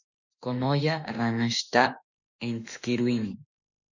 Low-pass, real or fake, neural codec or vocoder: 7.2 kHz; fake; autoencoder, 48 kHz, 32 numbers a frame, DAC-VAE, trained on Japanese speech